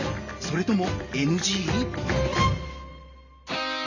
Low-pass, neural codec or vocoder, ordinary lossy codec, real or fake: 7.2 kHz; none; none; real